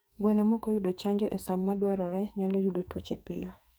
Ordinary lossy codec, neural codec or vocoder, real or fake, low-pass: none; codec, 44.1 kHz, 2.6 kbps, SNAC; fake; none